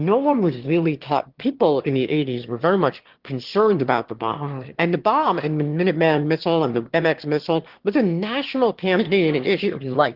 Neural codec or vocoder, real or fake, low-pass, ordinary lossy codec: autoencoder, 22.05 kHz, a latent of 192 numbers a frame, VITS, trained on one speaker; fake; 5.4 kHz; Opus, 16 kbps